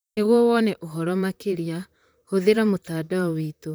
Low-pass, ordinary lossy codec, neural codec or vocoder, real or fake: none; none; vocoder, 44.1 kHz, 128 mel bands, Pupu-Vocoder; fake